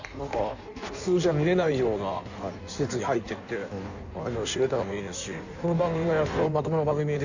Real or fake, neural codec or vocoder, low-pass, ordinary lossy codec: fake; codec, 16 kHz in and 24 kHz out, 1.1 kbps, FireRedTTS-2 codec; 7.2 kHz; none